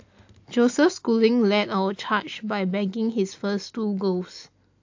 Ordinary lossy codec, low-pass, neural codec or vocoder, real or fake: AAC, 48 kbps; 7.2 kHz; none; real